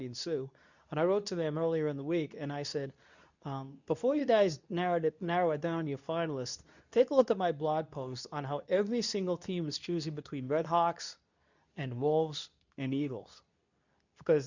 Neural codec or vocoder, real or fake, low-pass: codec, 24 kHz, 0.9 kbps, WavTokenizer, medium speech release version 2; fake; 7.2 kHz